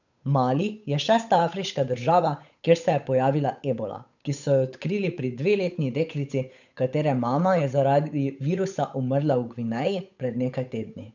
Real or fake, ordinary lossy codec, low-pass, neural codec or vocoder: fake; none; 7.2 kHz; codec, 16 kHz, 8 kbps, FunCodec, trained on Chinese and English, 25 frames a second